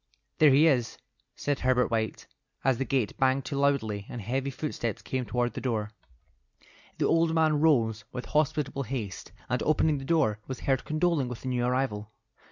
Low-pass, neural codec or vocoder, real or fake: 7.2 kHz; none; real